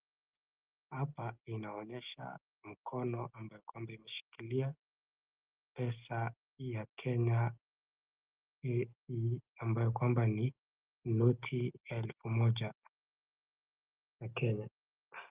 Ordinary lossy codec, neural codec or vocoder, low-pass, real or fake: Opus, 32 kbps; none; 3.6 kHz; real